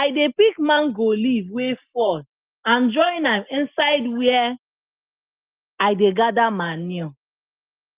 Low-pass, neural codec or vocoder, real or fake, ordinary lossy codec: 3.6 kHz; none; real; Opus, 24 kbps